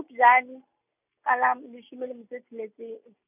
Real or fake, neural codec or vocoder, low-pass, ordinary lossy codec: real; none; 3.6 kHz; none